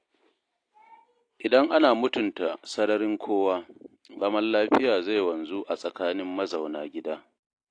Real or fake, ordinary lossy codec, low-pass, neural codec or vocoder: real; AAC, 48 kbps; 9.9 kHz; none